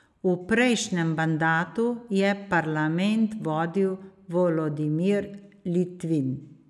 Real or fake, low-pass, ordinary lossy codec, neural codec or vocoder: real; none; none; none